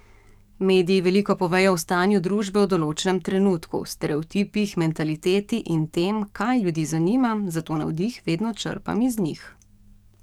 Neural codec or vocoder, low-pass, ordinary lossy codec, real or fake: codec, 44.1 kHz, 7.8 kbps, DAC; 19.8 kHz; none; fake